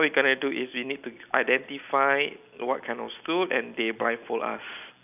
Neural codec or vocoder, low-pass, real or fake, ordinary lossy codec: none; 3.6 kHz; real; none